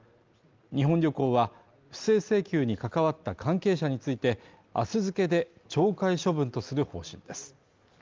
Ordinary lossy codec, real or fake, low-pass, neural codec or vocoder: Opus, 32 kbps; real; 7.2 kHz; none